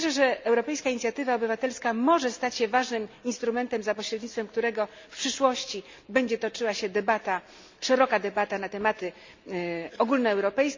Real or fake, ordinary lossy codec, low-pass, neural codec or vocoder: real; MP3, 64 kbps; 7.2 kHz; none